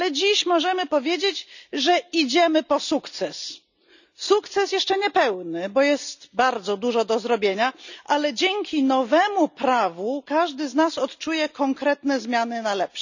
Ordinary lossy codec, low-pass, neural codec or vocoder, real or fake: none; 7.2 kHz; none; real